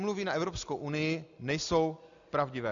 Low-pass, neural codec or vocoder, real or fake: 7.2 kHz; none; real